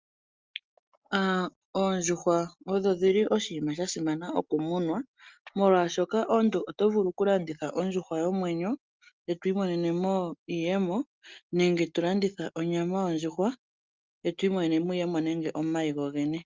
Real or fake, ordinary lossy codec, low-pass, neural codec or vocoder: real; Opus, 32 kbps; 7.2 kHz; none